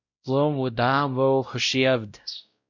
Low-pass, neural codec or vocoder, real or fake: 7.2 kHz; codec, 16 kHz, 0.5 kbps, X-Codec, WavLM features, trained on Multilingual LibriSpeech; fake